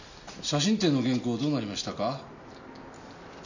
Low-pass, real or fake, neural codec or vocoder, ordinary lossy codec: 7.2 kHz; real; none; none